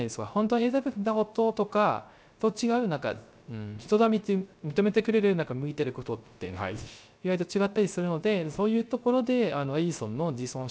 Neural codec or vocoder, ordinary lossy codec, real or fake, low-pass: codec, 16 kHz, 0.3 kbps, FocalCodec; none; fake; none